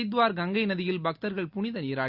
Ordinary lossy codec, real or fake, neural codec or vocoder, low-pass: Opus, 64 kbps; real; none; 5.4 kHz